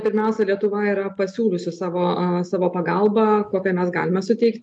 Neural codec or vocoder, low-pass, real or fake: none; 10.8 kHz; real